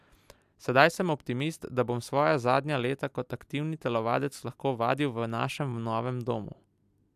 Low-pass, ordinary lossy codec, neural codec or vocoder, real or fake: 14.4 kHz; AAC, 96 kbps; none; real